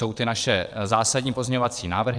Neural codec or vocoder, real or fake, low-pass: vocoder, 22.05 kHz, 80 mel bands, WaveNeXt; fake; 9.9 kHz